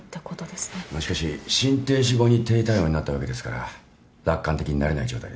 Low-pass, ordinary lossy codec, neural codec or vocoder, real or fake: none; none; none; real